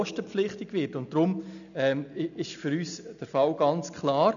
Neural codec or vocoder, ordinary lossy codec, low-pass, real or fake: none; none; 7.2 kHz; real